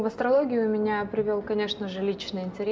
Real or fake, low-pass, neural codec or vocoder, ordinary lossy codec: real; none; none; none